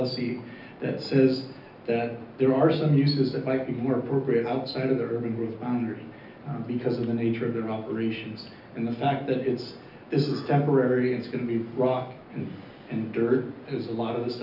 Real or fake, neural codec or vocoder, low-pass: real; none; 5.4 kHz